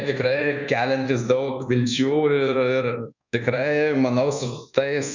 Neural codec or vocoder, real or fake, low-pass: codec, 24 kHz, 1.2 kbps, DualCodec; fake; 7.2 kHz